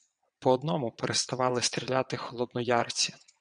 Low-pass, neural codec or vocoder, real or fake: 9.9 kHz; vocoder, 22.05 kHz, 80 mel bands, WaveNeXt; fake